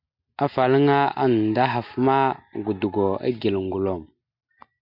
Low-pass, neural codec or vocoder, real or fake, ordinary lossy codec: 5.4 kHz; none; real; MP3, 48 kbps